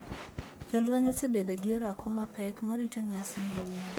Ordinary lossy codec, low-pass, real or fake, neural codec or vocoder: none; none; fake; codec, 44.1 kHz, 1.7 kbps, Pupu-Codec